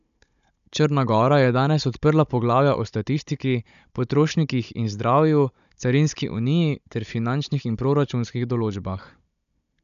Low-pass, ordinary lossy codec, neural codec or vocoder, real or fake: 7.2 kHz; none; codec, 16 kHz, 16 kbps, FunCodec, trained on Chinese and English, 50 frames a second; fake